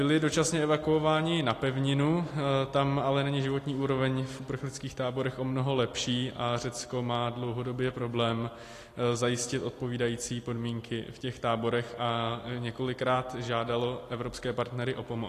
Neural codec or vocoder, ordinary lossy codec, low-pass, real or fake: none; AAC, 48 kbps; 14.4 kHz; real